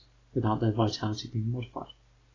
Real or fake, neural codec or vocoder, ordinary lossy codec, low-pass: real; none; AAC, 32 kbps; 7.2 kHz